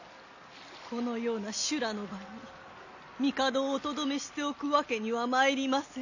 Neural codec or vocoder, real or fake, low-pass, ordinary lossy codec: none; real; 7.2 kHz; none